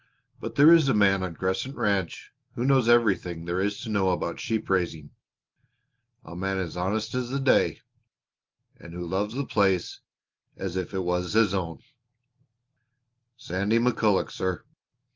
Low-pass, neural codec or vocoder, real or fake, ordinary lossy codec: 7.2 kHz; none; real; Opus, 32 kbps